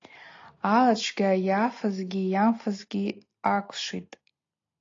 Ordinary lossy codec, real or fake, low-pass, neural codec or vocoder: AAC, 32 kbps; real; 7.2 kHz; none